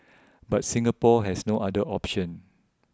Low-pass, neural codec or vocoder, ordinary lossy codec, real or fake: none; none; none; real